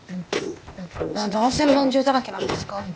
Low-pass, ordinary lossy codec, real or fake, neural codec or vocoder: none; none; fake; codec, 16 kHz, 0.8 kbps, ZipCodec